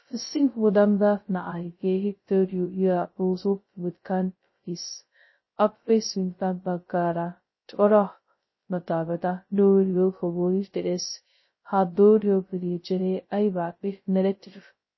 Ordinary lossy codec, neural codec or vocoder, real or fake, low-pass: MP3, 24 kbps; codec, 16 kHz, 0.2 kbps, FocalCodec; fake; 7.2 kHz